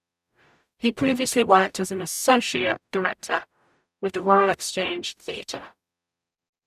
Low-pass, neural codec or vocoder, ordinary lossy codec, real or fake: 14.4 kHz; codec, 44.1 kHz, 0.9 kbps, DAC; none; fake